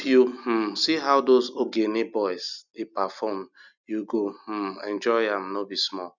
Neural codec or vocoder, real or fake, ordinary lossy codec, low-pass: none; real; none; 7.2 kHz